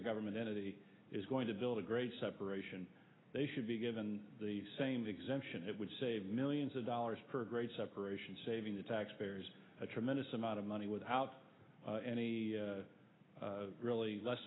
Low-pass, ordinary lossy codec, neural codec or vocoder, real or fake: 7.2 kHz; AAC, 16 kbps; none; real